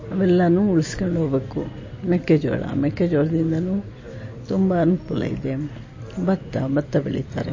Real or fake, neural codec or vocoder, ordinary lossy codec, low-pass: real; none; MP3, 32 kbps; 7.2 kHz